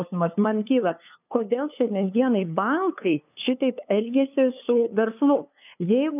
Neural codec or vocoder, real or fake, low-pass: codec, 16 kHz, 2 kbps, FunCodec, trained on LibriTTS, 25 frames a second; fake; 3.6 kHz